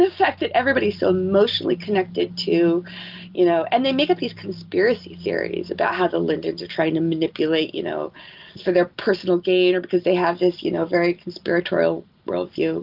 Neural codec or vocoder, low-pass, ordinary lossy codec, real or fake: none; 5.4 kHz; Opus, 32 kbps; real